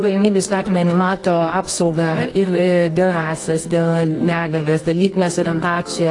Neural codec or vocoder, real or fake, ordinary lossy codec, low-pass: codec, 24 kHz, 0.9 kbps, WavTokenizer, medium music audio release; fake; AAC, 48 kbps; 10.8 kHz